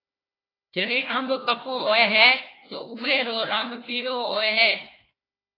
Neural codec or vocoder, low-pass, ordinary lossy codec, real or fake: codec, 16 kHz, 1 kbps, FunCodec, trained on Chinese and English, 50 frames a second; 5.4 kHz; AAC, 24 kbps; fake